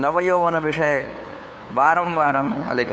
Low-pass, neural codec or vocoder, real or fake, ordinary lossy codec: none; codec, 16 kHz, 2 kbps, FunCodec, trained on LibriTTS, 25 frames a second; fake; none